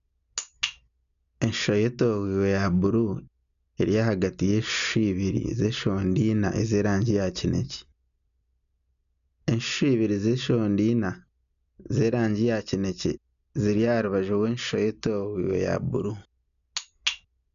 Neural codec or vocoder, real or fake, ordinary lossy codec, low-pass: none; real; none; 7.2 kHz